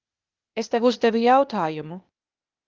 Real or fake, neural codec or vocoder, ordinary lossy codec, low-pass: fake; codec, 16 kHz, 0.8 kbps, ZipCodec; Opus, 32 kbps; 7.2 kHz